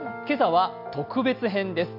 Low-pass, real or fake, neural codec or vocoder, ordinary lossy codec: 5.4 kHz; real; none; none